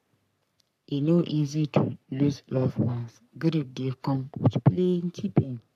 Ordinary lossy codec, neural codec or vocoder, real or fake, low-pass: none; codec, 44.1 kHz, 3.4 kbps, Pupu-Codec; fake; 14.4 kHz